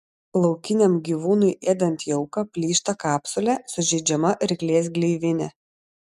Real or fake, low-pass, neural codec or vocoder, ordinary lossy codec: real; 14.4 kHz; none; MP3, 96 kbps